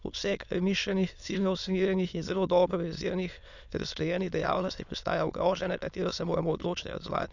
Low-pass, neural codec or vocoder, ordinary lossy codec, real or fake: 7.2 kHz; autoencoder, 22.05 kHz, a latent of 192 numbers a frame, VITS, trained on many speakers; none; fake